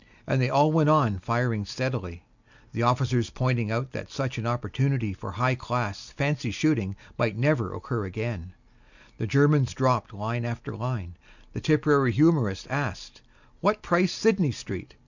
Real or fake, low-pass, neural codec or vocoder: real; 7.2 kHz; none